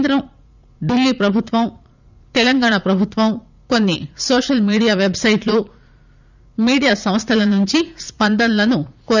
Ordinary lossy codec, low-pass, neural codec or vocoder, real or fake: none; 7.2 kHz; vocoder, 44.1 kHz, 80 mel bands, Vocos; fake